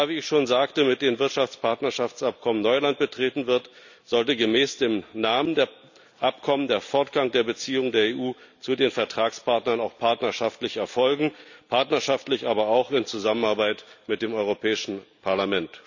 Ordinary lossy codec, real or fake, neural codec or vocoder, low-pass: none; real; none; 7.2 kHz